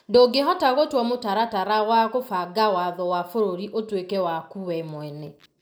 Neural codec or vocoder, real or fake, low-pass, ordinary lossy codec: none; real; none; none